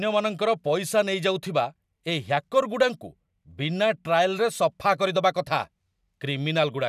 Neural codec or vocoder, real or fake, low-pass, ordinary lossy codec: none; real; 14.4 kHz; none